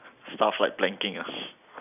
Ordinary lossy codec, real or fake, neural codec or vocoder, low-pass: none; real; none; 3.6 kHz